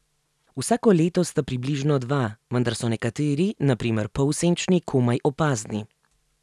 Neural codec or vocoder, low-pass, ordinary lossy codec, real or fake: none; none; none; real